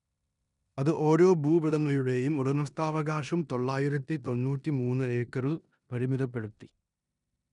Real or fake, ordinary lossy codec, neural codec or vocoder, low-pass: fake; none; codec, 16 kHz in and 24 kHz out, 0.9 kbps, LongCat-Audio-Codec, four codebook decoder; 10.8 kHz